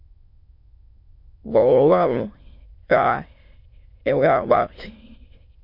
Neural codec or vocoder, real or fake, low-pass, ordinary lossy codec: autoencoder, 22.05 kHz, a latent of 192 numbers a frame, VITS, trained on many speakers; fake; 5.4 kHz; MP3, 32 kbps